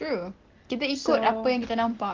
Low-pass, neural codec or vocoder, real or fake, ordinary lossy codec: 7.2 kHz; none; real; Opus, 16 kbps